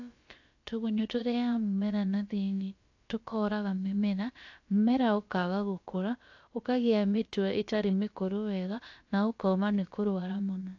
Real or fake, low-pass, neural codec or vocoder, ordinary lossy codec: fake; 7.2 kHz; codec, 16 kHz, about 1 kbps, DyCAST, with the encoder's durations; AAC, 48 kbps